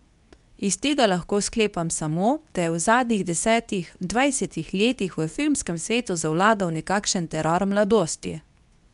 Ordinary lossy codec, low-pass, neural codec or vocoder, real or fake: none; 10.8 kHz; codec, 24 kHz, 0.9 kbps, WavTokenizer, medium speech release version 2; fake